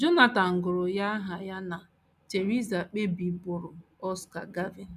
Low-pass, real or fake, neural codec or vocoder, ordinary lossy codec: 14.4 kHz; real; none; none